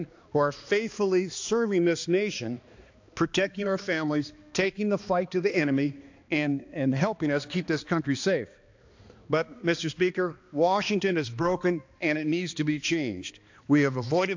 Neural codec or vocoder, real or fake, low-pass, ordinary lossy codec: codec, 16 kHz, 2 kbps, X-Codec, HuBERT features, trained on balanced general audio; fake; 7.2 kHz; AAC, 48 kbps